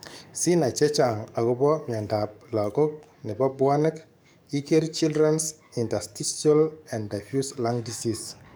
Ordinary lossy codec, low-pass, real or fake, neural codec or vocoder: none; none; fake; codec, 44.1 kHz, 7.8 kbps, DAC